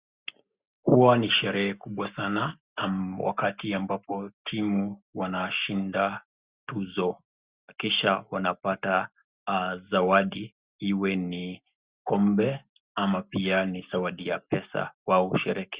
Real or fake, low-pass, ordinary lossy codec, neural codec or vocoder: real; 3.6 kHz; Opus, 64 kbps; none